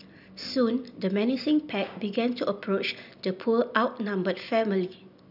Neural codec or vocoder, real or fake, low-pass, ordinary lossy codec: none; real; 5.4 kHz; none